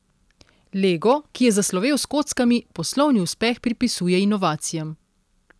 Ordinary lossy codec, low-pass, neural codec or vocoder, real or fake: none; none; none; real